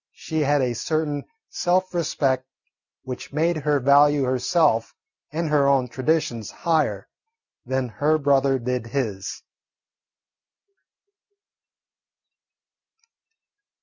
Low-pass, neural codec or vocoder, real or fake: 7.2 kHz; none; real